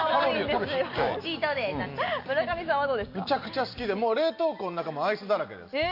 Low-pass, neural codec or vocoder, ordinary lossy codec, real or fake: 5.4 kHz; none; AAC, 48 kbps; real